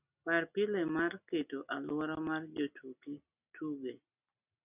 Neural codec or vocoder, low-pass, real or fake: none; 3.6 kHz; real